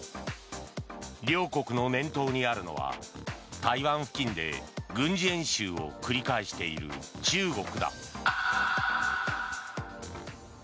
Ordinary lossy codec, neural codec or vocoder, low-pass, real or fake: none; none; none; real